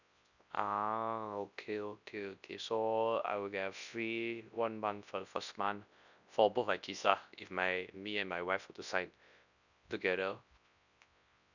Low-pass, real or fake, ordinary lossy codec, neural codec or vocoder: 7.2 kHz; fake; none; codec, 24 kHz, 0.9 kbps, WavTokenizer, large speech release